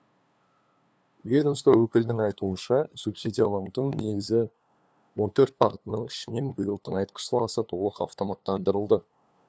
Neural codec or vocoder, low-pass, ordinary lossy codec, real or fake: codec, 16 kHz, 2 kbps, FunCodec, trained on LibriTTS, 25 frames a second; none; none; fake